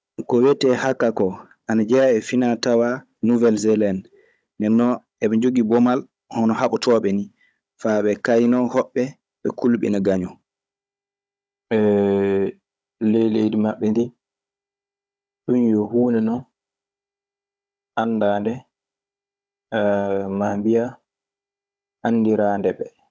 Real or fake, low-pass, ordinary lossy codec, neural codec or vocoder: fake; none; none; codec, 16 kHz, 16 kbps, FunCodec, trained on Chinese and English, 50 frames a second